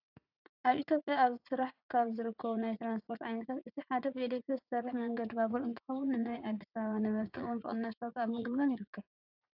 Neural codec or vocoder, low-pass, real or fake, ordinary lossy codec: vocoder, 44.1 kHz, 80 mel bands, Vocos; 5.4 kHz; fake; AAC, 48 kbps